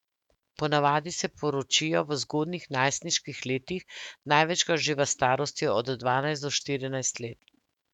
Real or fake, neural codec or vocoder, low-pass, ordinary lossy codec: fake; autoencoder, 48 kHz, 128 numbers a frame, DAC-VAE, trained on Japanese speech; 19.8 kHz; none